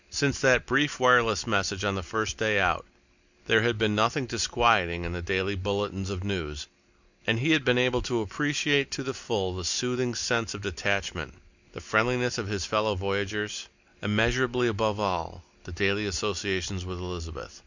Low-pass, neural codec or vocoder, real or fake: 7.2 kHz; none; real